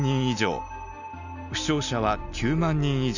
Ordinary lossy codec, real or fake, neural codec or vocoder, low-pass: none; real; none; 7.2 kHz